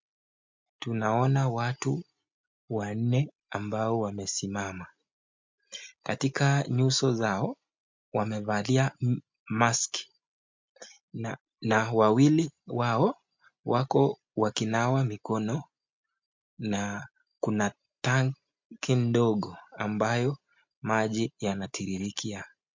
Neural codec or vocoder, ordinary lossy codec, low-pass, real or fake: none; MP3, 64 kbps; 7.2 kHz; real